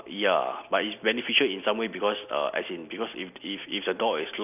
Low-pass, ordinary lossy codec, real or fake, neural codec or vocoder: 3.6 kHz; none; real; none